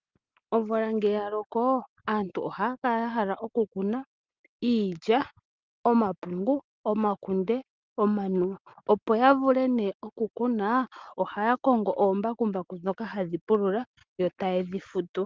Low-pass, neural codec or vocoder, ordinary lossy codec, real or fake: 7.2 kHz; none; Opus, 32 kbps; real